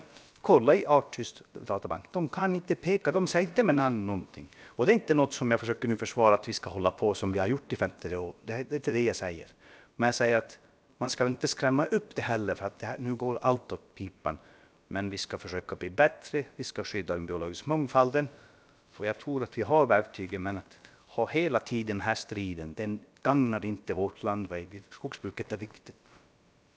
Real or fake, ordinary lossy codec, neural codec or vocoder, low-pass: fake; none; codec, 16 kHz, about 1 kbps, DyCAST, with the encoder's durations; none